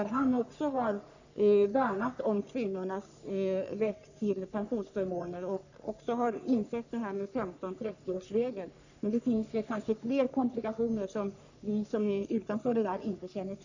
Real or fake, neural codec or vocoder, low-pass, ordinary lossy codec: fake; codec, 44.1 kHz, 3.4 kbps, Pupu-Codec; 7.2 kHz; none